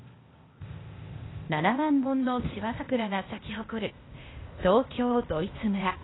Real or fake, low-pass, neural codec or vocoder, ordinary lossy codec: fake; 7.2 kHz; codec, 16 kHz, 0.8 kbps, ZipCodec; AAC, 16 kbps